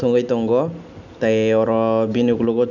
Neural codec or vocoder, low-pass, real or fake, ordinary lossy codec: none; 7.2 kHz; real; none